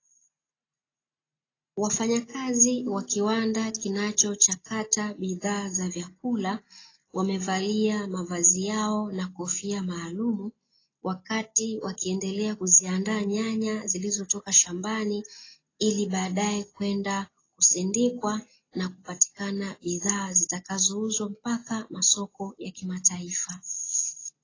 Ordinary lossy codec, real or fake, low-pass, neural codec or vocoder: AAC, 32 kbps; real; 7.2 kHz; none